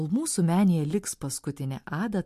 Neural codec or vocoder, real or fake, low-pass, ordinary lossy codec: none; real; 14.4 kHz; MP3, 64 kbps